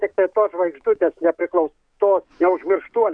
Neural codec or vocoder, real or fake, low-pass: codec, 44.1 kHz, 7.8 kbps, DAC; fake; 9.9 kHz